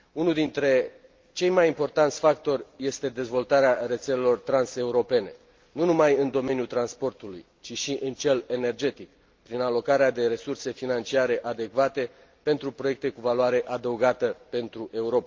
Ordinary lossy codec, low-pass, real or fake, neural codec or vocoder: Opus, 32 kbps; 7.2 kHz; real; none